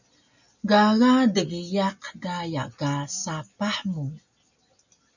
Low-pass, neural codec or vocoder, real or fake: 7.2 kHz; none; real